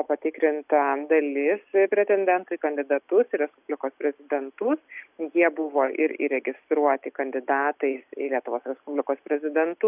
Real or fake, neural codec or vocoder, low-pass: real; none; 3.6 kHz